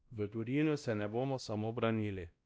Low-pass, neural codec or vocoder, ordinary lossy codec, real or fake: none; codec, 16 kHz, 1 kbps, X-Codec, WavLM features, trained on Multilingual LibriSpeech; none; fake